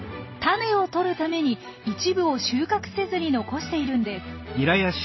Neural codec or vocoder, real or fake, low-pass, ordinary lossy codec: none; real; 7.2 kHz; MP3, 24 kbps